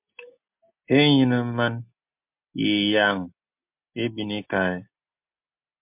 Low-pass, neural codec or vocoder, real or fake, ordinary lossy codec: 3.6 kHz; none; real; MP3, 32 kbps